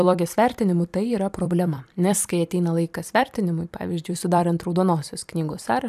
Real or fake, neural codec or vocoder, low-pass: fake; vocoder, 44.1 kHz, 128 mel bands every 256 samples, BigVGAN v2; 14.4 kHz